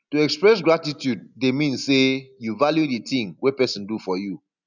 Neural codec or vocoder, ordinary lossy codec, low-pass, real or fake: none; none; 7.2 kHz; real